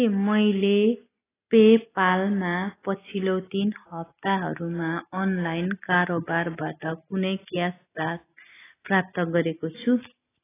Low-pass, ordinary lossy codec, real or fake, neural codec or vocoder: 3.6 kHz; AAC, 16 kbps; real; none